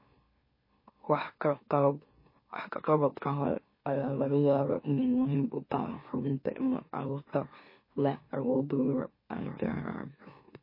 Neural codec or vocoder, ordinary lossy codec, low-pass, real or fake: autoencoder, 44.1 kHz, a latent of 192 numbers a frame, MeloTTS; MP3, 24 kbps; 5.4 kHz; fake